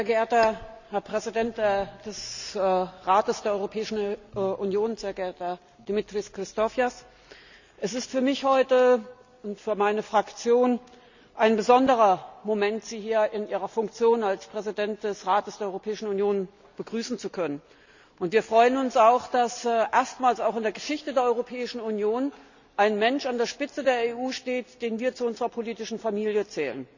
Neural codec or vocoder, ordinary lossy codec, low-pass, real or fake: none; none; 7.2 kHz; real